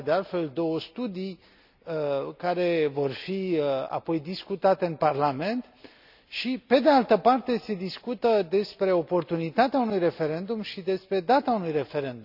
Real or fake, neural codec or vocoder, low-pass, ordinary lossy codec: real; none; 5.4 kHz; none